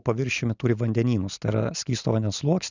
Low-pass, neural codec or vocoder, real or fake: 7.2 kHz; none; real